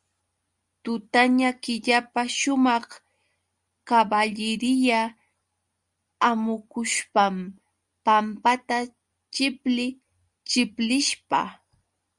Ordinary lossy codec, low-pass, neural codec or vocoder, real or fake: Opus, 64 kbps; 10.8 kHz; none; real